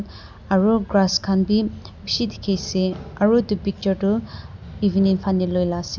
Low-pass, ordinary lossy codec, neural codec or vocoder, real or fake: 7.2 kHz; none; none; real